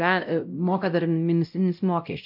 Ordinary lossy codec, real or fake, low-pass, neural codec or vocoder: AAC, 48 kbps; fake; 5.4 kHz; codec, 16 kHz, 0.5 kbps, X-Codec, WavLM features, trained on Multilingual LibriSpeech